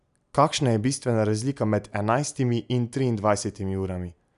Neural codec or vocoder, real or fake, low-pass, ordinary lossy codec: none; real; 10.8 kHz; none